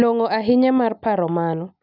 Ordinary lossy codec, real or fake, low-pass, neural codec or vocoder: none; real; 5.4 kHz; none